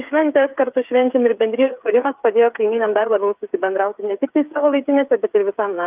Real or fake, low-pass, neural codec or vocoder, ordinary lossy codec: fake; 3.6 kHz; codec, 16 kHz, 8 kbps, FreqCodec, smaller model; Opus, 24 kbps